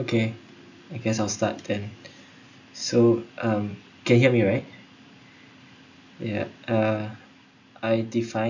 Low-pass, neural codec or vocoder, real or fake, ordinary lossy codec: 7.2 kHz; none; real; none